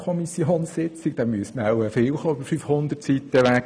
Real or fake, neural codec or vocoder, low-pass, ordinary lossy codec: real; none; none; none